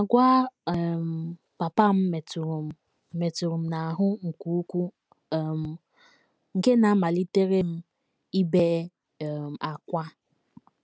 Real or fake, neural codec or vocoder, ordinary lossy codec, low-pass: real; none; none; none